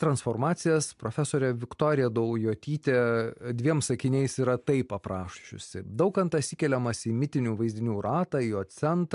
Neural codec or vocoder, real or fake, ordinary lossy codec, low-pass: none; real; MP3, 64 kbps; 10.8 kHz